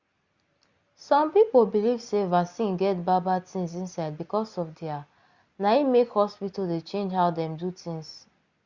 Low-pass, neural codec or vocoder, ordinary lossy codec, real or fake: 7.2 kHz; none; Opus, 64 kbps; real